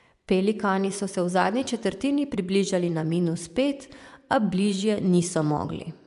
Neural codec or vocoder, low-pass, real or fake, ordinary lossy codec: vocoder, 24 kHz, 100 mel bands, Vocos; 10.8 kHz; fake; none